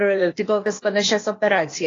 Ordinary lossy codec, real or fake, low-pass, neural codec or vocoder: AAC, 32 kbps; fake; 7.2 kHz; codec, 16 kHz, 0.8 kbps, ZipCodec